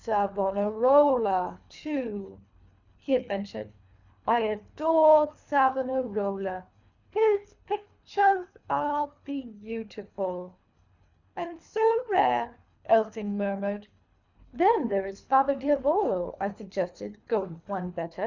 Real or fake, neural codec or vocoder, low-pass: fake; codec, 24 kHz, 3 kbps, HILCodec; 7.2 kHz